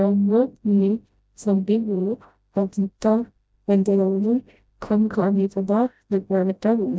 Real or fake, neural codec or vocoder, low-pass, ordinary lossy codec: fake; codec, 16 kHz, 0.5 kbps, FreqCodec, smaller model; none; none